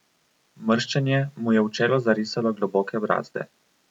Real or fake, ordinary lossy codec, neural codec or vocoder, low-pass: real; none; none; 19.8 kHz